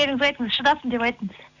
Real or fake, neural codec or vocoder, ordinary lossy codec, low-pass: real; none; none; 7.2 kHz